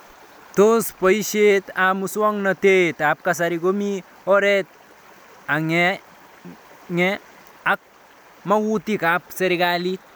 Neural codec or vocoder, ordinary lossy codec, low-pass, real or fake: none; none; none; real